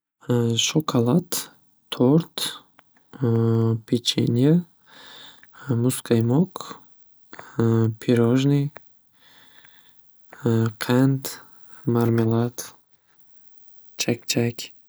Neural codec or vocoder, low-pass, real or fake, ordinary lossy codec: none; none; real; none